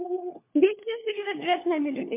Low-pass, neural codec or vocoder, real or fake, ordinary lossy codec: 3.6 kHz; codec, 16 kHz, 4 kbps, FunCodec, trained on LibriTTS, 50 frames a second; fake; MP3, 24 kbps